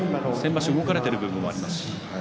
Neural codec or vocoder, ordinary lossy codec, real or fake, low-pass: none; none; real; none